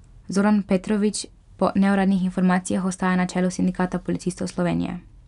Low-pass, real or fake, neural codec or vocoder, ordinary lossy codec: 10.8 kHz; real; none; none